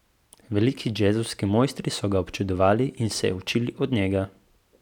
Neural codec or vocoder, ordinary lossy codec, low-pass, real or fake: none; none; 19.8 kHz; real